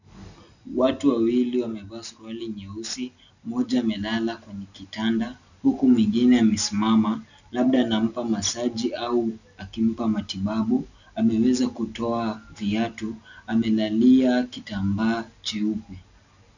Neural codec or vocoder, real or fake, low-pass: none; real; 7.2 kHz